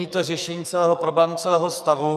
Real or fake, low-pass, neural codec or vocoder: fake; 14.4 kHz; codec, 44.1 kHz, 2.6 kbps, SNAC